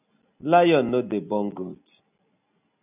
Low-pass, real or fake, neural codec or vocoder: 3.6 kHz; real; none